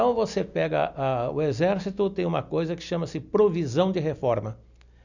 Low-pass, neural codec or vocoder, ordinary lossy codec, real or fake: 7.2 kHz; none; none; real